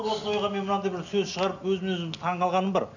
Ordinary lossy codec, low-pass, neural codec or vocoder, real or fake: none; 7.2 kHz; none; real